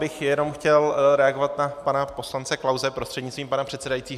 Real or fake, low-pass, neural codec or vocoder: real; 14.4 kHz; none